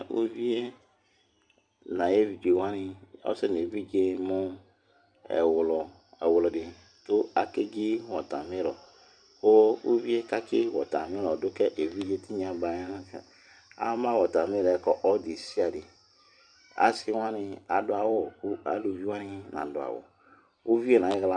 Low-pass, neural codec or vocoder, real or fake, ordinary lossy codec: 9.9 kHz; vocoder, 44.1 kHz, 128 mel bands every 256 samples, BigVGAN v2; fake; MP3, 96 kbps